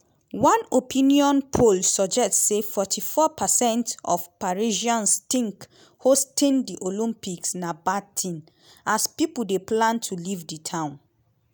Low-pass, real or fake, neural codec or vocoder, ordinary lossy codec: none; real; none; none